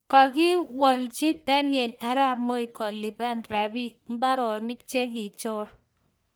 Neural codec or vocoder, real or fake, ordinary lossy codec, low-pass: codec, 44.1 kHz, 1.7 kbps, Pupu-Codec; fake; none; none